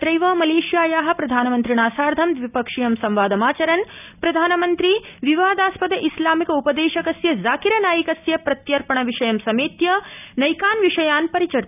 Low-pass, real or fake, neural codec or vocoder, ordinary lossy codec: 3.6 kHz; real; none; none